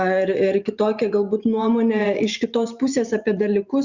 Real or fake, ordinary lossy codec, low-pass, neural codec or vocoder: fake; Opus, 64 kbps; 7.2 kHz; vocoder, 44.1 kHz, 128 mel bands every 512 samples, BigVGAN v2